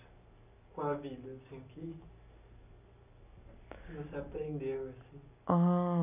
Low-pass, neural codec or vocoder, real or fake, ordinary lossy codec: 3.6 kHz; none; real; none